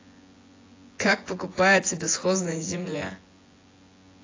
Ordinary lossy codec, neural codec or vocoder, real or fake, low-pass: AAC, 32 kbps; vocoder, 24 kHz, 100 mel bands, Vocos; fake; 7.2 kHz